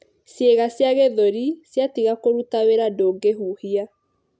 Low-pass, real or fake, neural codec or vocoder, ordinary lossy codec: none; real; none; none